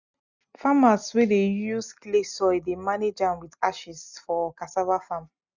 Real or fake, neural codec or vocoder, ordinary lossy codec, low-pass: real; none; none; 7.2 kHz